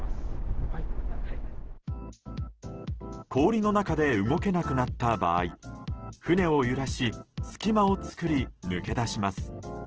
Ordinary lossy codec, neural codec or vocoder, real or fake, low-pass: Opus, 16 kbps; none; real; 7.2 kHz